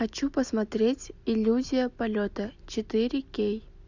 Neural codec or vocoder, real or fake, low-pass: none; real; 7.2 kHz